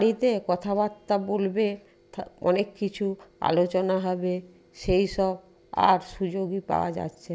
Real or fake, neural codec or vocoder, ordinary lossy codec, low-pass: real; none; none; none